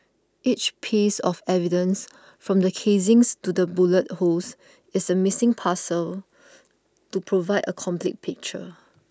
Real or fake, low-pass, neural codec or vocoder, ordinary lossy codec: real; none; none; none